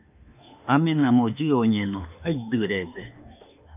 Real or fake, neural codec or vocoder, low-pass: fake; autoencoder, 48 kHz, 32 numbers a frame, DAC-VAE, trained on Japanese speech; 3.6 kHz